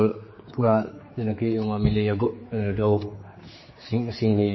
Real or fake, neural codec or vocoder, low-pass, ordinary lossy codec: fake; codec, 16 kHz, 4 kbps, X-Codec, HuBERT features, trained on general audio; 7.2 kHz; MP3, 24 kbps